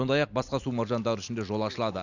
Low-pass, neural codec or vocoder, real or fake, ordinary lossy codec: 7.2 kHz; none; real; none